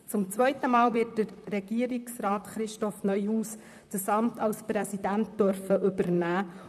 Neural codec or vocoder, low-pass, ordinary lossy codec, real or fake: vocoder, 44.1 kHz, 128 mel bands, Pupu-Vocoder; 14.4 kHz; none; fake